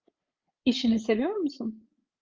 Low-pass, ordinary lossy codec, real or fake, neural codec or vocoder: 7.2 kHz; Opus, 24 kbps; fake; codec, 16 kHz, 16 kbps, FreqCodec, larger model